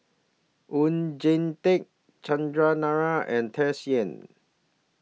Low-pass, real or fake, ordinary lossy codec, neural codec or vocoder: none; real; none; none